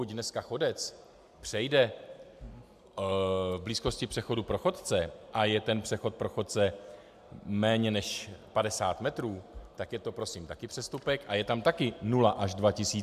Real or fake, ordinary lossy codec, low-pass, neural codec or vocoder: real; MP3, 96 kbps; 14.4 kHz; none